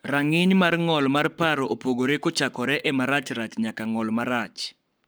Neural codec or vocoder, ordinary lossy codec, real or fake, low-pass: codec, 44.1 kHz, 7.8 kbps, Pupu-Codec; none; fake; none